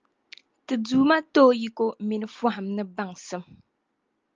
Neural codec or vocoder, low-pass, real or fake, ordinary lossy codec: none; 7.2 kHz; real; Opus, 24 kbps